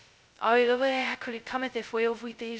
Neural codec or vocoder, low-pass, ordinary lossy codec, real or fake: codec, 16 kHz, 0.2 kbps, FocalCodec; none; none; fake